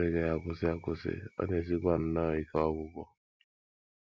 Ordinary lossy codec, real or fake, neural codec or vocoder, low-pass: none; real; none; none